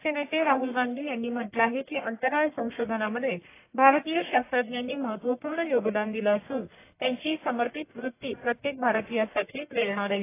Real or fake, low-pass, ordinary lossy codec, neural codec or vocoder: fake; 3.6 kHz; AAC, 24 kbps; codec, 44.1 kHz, 1.7 kbps, Pupu-Codec